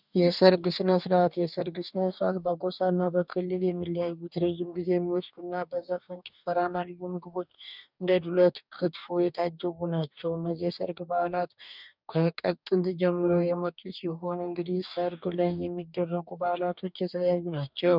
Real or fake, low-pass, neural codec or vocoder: fake; 5.4 kHz; codec, 44.1 kHz, 2.6 kbps, DAC